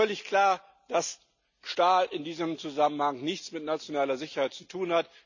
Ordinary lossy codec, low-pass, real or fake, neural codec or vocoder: none; 7.2 kHz; real; none